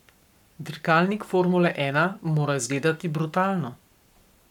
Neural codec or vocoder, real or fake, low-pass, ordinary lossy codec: codec, 44.1 kHz, 7.8 kbps, Pupu-Codec; fake; 19.8 kHz; none